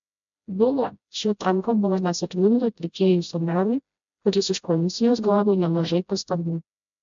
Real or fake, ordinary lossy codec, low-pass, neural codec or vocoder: fake; MP3, 64 kbps; 7.2 kHz; codec, 16 kHz, 0.5 kbps, FreqCodec, smaller model